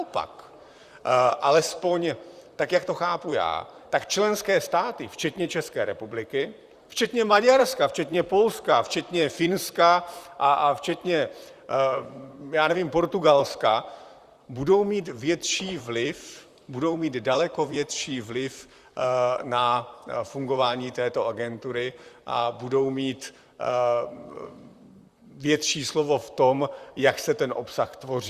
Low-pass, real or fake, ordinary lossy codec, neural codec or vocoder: 14.4 kHz; fake; Opus, 64 kbps; vocoder, 44.1 kHz, 128 mel bands, Pupu-Vocoder